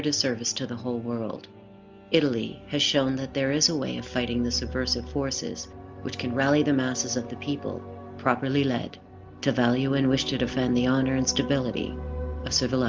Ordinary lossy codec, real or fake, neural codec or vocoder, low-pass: Opus, 32 kbps; real; none; 7.2 kHz